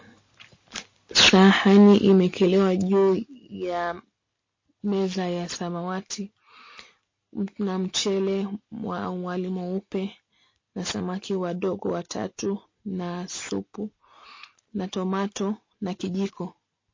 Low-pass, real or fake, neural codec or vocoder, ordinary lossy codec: 7.2 kHz; real; none; MP3, 32 kbps